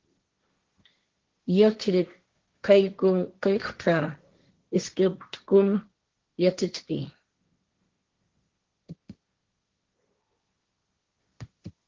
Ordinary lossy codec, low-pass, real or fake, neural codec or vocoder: Opus, 16 kbps; 7.2 kHz; fake; codec, 16 kHz, 1.1 kbps, Voila-Tokenizer